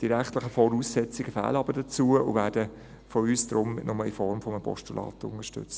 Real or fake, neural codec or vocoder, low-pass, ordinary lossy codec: real; none; none; none